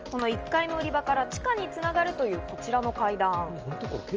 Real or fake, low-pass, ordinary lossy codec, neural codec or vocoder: real; 7.2 kHz; Opus, 24 kbps; none